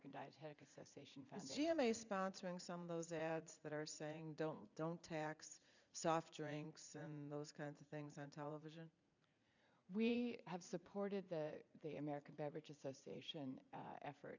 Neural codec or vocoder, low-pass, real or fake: vocoder, 44.1 kHz, 80 mel bands, Vocos; 7.2 kHz; fake